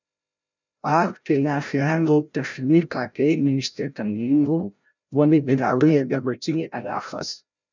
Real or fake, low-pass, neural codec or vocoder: fake; 7.2 kHz; codec, 16 kHz, 0.5 kbps, FreqCodec, larger model